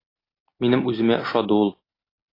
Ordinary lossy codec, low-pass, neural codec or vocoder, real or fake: AAC, 24 kbps; 5.4 kHz; none; real